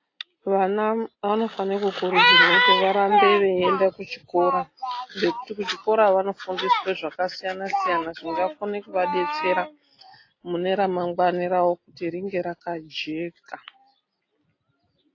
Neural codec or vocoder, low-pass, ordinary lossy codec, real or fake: none; 7.2 kHz; AAC, 32 kbps; real